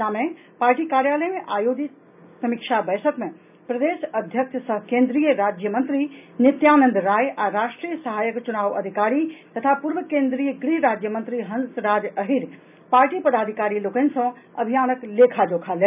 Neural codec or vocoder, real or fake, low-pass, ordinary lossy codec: none; real; 3.6 kHz; none